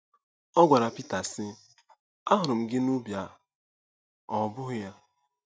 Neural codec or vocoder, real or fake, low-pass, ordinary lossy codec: none; real; none; none